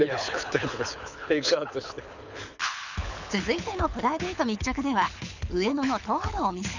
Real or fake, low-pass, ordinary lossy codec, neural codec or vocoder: fake; 7.2 kHz; none; codec, 24 kHz, 6 kbps, HILCodec